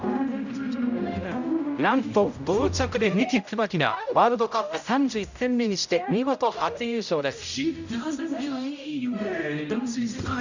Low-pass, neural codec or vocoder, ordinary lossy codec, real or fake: 7.2 kHz; codec, 16 kHz, 0.5 kbps, X-Codec, HuBERT features, trained on general audio; none; fake